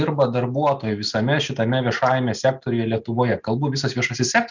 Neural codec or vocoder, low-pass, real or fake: none; 7.2 kHz; real